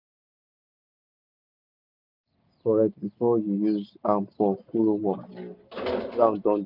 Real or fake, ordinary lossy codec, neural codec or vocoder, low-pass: real; none; none; 5.4 kHz